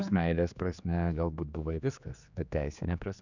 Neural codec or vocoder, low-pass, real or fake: codec, 16 kHz, 2 kbps, X-Codec, HuBERT features, trained on general audio; 7.2 kHz; fake